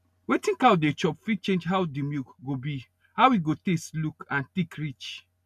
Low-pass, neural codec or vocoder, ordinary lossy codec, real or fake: 14.4 kHz; none; none; real